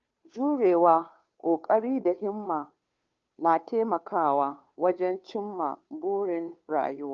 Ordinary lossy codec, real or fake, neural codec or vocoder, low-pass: Opus, 24 kbps; fake; codec, 16 kHz, 2 kbps, FunCodec, trained on Chinese and English, 25 frames a second; 7.2 kHz